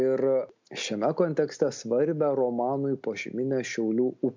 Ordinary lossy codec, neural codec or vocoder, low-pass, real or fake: MP3, 64 kbps; none; 7.2 kHz; real